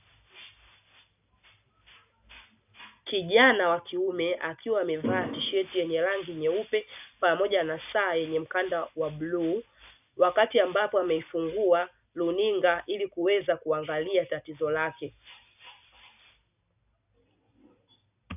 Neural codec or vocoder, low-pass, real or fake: none; 3.6 kHz; real